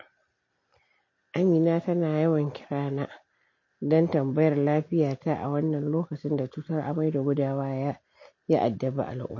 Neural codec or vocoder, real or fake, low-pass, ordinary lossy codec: none; real; 7.2 kHz; MP3, 32 kbps